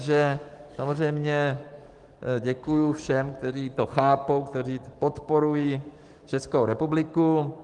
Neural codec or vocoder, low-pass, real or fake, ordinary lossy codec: codec, 44.1 kHz, 7.8 kbps, DAC; 10.8 kHz; fake; Opus, 24 kbps